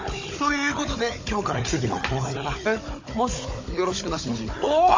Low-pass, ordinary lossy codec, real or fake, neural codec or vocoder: 7.2 kHz; MP3, 32 kbps; fake; codec, 16 kHz, 16 kbps, FunCodec, trained on Chinese and English, 50 frames a second